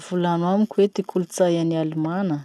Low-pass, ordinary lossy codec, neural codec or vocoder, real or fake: none; none; none; real